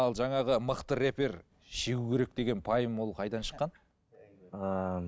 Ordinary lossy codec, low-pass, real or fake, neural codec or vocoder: none; none; real; none